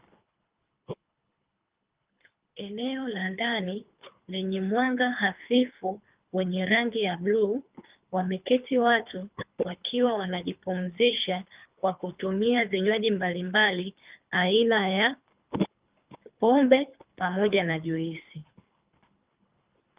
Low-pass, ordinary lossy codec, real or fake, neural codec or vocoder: 3.6 kHz; Opus, 24 kbps; fake; codec, 24 kHz, 3 kbps, HILCodec